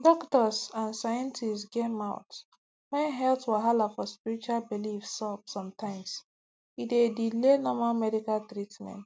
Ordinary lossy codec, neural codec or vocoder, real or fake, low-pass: none; none; real; none